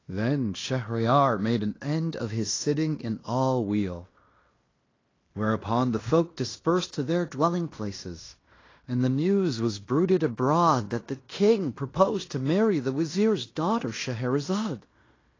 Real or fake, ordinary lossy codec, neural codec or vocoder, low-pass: fake; AAC, 32 kbps; codec, 16 kHz in and 24 kHz out, 0.9 kbps, LongCat-Audio-Codec, fine tuned four codebook decoder; 7.2 kHz